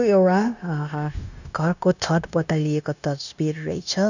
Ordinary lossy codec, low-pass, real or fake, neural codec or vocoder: none; 7.2 kHz; fake; codec, 16 kHz, 0.9 kbps, LongCat-Audio-Codec